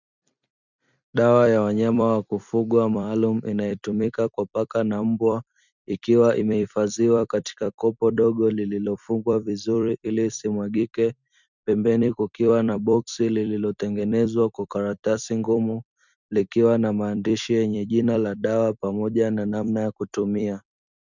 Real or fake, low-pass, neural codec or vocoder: fake; 7.2 kHz; vocoder, 44.1 kHz, 128 mel bands every 256 samples, BigVGAN v2